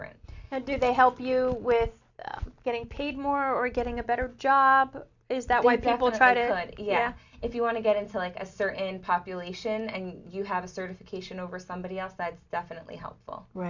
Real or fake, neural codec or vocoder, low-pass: real; none; 7.2 kHz